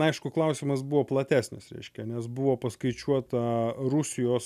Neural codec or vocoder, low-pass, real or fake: none; 14.4 kHz; real